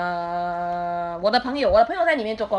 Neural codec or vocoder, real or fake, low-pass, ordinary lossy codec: none; real; 9.9 kHz; Opus, 64 kbps